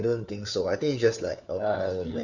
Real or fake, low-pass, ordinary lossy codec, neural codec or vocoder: fake; 7.2 kHz; none; codec, 16 kHz, 4 kbps, FunCodec, trained on LibriTTS, 50 frames a second